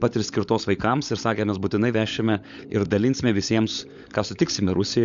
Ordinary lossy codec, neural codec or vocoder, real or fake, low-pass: Opus, 64 kbps; codec, 16 kHz, 16 kbps, FunCodec, trained on LibriTTS, 50 frames a second; fake; 7.2 kHz